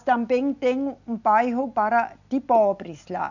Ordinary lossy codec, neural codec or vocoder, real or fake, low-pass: none; none; real; 7.2 kHz